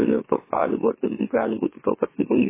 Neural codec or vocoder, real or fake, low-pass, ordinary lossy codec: autoencoder, 44.1 kHz, a latent of 192 numbers a frame, MeloTTS; fake; 3.6 kHz; MP3, 16 kbps